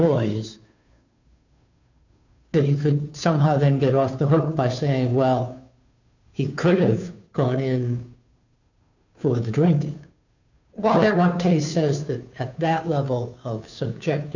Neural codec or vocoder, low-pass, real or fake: codec, 16 kHz, 2 kbps, FunCodec, trained on Chinese and English, 25 frames a second; 7.2 kHz; fake